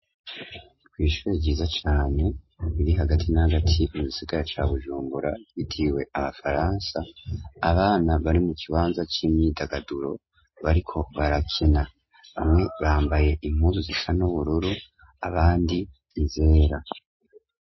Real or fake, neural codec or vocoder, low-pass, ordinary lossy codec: real; none; 7.2 kHz; MP3, 24 kbps